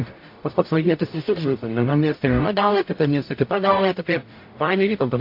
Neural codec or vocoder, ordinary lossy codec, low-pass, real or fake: codec, 44.1 kHz, 0.9 kbps, DAC; MP3, 32 kbps; 5.4 kHz; fake